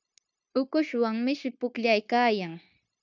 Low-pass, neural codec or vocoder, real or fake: 7.2 kHz; codec, 16 kHz, 0.9 kbps, LongCat-Audio-Codec; fake